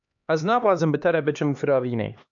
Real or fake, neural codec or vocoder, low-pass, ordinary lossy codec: fake; codec, 16 kHz, 2 kbps, X-Codec, HuBERT features, trained on LibriSpeech; 7.2 kHz; AAC, 64 kbps